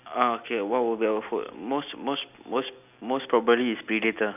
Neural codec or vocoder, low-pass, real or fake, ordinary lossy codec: none; 3.6 kHz; real; none